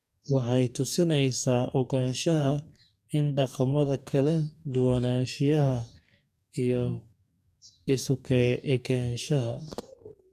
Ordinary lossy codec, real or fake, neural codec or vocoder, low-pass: none; fake; codec, 44.1 kHz, 2.6 kbps, DAC; 14.4 kHz